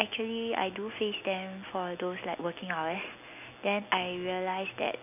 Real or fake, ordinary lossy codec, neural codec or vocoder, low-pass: real; none; none; 3.6 kHz